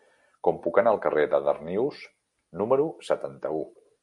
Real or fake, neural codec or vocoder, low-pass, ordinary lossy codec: real; none; 10.8 kHz; MP3, 64 kbps